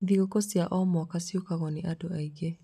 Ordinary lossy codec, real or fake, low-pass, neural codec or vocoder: none; real; 14.4 kHz; none